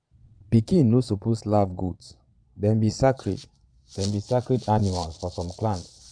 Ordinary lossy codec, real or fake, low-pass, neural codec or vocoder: MP3, 96 kbps; fake; 9.9 kHz; vocoder, 22.05 kHz, 80 mel bands, Vocos